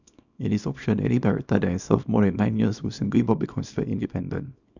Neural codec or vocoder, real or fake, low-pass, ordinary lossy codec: codec, 24 kHz, 0.9 kbps, WavTokenizer, small release; fake; 7.2 kHz; none